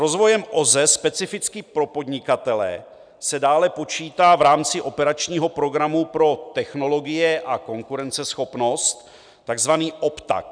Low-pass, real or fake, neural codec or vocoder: 9.9 kHz; real; none